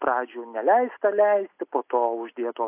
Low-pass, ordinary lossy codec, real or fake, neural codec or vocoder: 3.6 kHz; AAC, 32 kbps; real; none